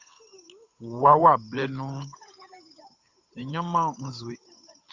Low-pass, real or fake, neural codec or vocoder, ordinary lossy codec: 7.2 kHz; fake; codec, 16 kHz, 8 kbps, FunCodec, trained on Chinese and English, 25 frames a second; Opus, 64 kbps